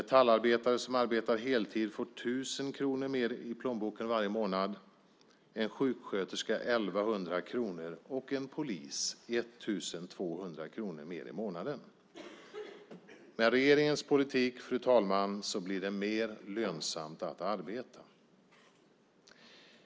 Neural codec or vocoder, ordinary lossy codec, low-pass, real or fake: none; none; none; real